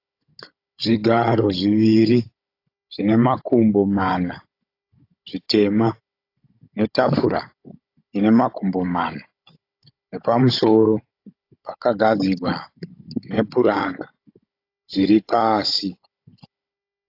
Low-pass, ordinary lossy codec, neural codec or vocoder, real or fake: 5.4 kHz; AAC, 32 kbps; codec, 16 kHz, 16 kbps, FunCodec, trained on Chinese and English, 50 frames a second; fake